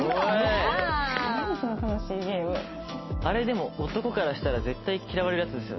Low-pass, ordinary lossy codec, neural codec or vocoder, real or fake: 7.2 kHz; MP3, 24 kbps; none; real